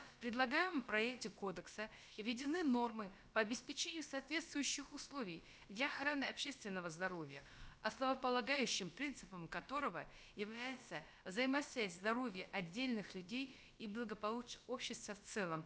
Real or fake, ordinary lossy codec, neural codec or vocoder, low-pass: fake; none; codec, 16 kHz, about 1 kbps, DyCAST, with the encoder's durations; none